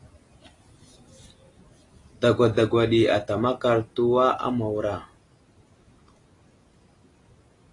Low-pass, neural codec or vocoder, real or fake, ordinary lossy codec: 10.8 kHz; none; real; AAC, 32 kbps